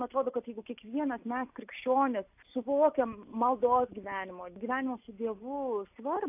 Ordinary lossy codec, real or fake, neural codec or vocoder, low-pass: AAC, 32 kbps; real; none; 3.6 kHz